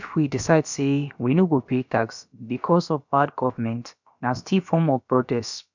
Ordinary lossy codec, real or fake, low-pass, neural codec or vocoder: none; fake; 7.2 kHz; codec, 16 kHz, about 1 kbps, DyCAST, with the encoder's durations